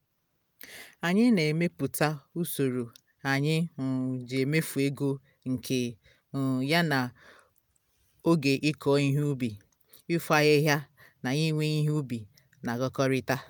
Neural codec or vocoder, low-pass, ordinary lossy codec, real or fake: none; none; none; real